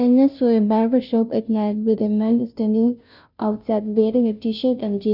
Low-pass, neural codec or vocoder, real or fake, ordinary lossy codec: 5.4 kHz; codec, 16 kHz, 0.5 kbps, FunCodec, trained on Chinese and English, 25 frames a second; fake; none